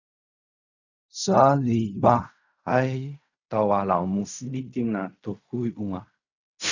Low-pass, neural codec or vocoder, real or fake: 7.2 kHz; codec, 16 kHz in and 24 kHz out, 0.4 kbps, LongCat-Audio-Codec, fine tuned four codebook decoder; fake